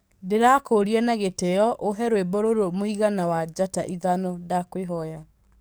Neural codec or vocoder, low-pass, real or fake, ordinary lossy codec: codec, 44.1 kHz, 7.8 kbps, DAC; none; fake; none